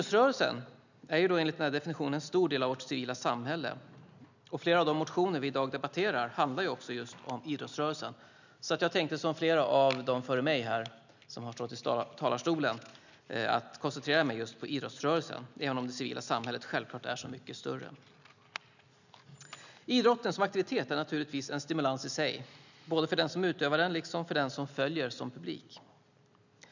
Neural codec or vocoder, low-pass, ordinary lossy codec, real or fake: none; 7.2 kHz; none; real